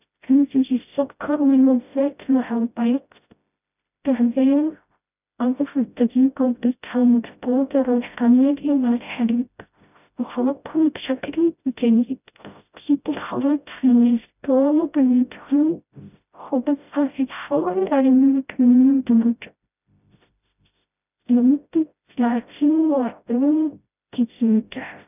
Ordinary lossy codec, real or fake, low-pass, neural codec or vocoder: none; fake; 3.6 kHz; codec, 16 kHz, 0.5 kbps, FreqCodec, smaller model